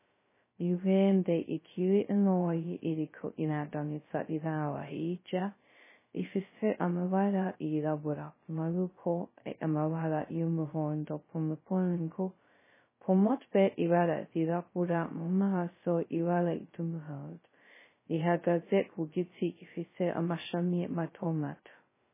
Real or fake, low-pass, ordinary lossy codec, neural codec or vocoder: fake; 3.6 kHz; MP3, 16 kbps; codec, 16 kHz, 0.2 kbps, FocalCodec